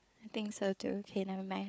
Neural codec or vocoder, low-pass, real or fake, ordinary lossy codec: codec, 16 kHz, 4 kbps, FunCodec, trained on Chinese and English, 50 frames a second; none; fake; none